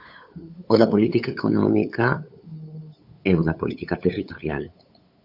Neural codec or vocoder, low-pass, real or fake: codec, 16 kHz, 8 kbps, FunCodec, trained on LibriTTS, 25 frames a second; 5.4 kHz; fake